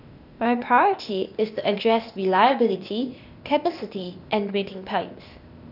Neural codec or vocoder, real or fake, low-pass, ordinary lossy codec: codec, 16 kHz, 0.8 kbps, ZipCodec; fake; 5.4 kHz; none